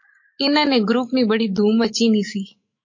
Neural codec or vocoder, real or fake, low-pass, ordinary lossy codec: autoencoder, 48 kHz, 128 numbers a frame, DAC-VAE, trained on Japanese speech; fake; 7.2 kHz; MP3, 32 kbps